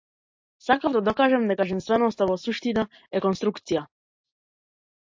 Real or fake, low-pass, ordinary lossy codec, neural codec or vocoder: real; 7.2 kHz; MP3, 48 kbps; none